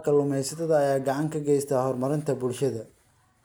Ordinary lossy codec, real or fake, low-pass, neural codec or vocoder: none; real; none; none